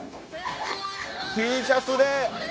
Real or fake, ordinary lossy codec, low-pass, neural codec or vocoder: fake; none; none; codec, 16 kHz, 0.9 kbps, LongCat-Audio-Codec